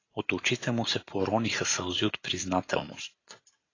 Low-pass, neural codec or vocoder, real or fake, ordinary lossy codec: 7.2 kHz; none; real; AAC, 32 kbps